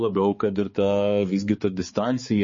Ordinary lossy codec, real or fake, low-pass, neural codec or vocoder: MP3, 32 kbps; fake; 7.2 kHz; codec, 16 kHz, 4 kbps, X-Codec, HuBERT features, trained on balanced general audio